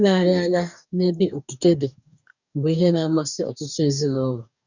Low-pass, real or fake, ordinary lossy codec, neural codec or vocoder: 7.2 kHz; fake; none; codec, 44.1 kHz, 2.6 kbps, DAC